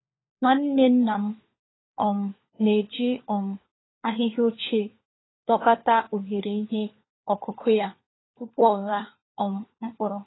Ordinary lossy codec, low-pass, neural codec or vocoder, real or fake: AAC, 16 kbps; 7.2 kHz; codec, 16 kHz, 4 kbps, FunCodec, trained on LibriTTS, 50 frames a second; fake